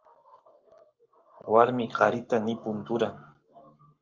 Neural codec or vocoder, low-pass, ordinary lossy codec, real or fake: codec, 44.1 kHz, 7.8 kbps, Pupu-Codec; 7.2 kHz; Opus, 24 kbps; fake